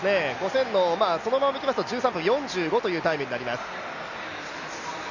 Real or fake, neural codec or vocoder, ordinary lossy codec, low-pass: real; none; none; 7.2 kHz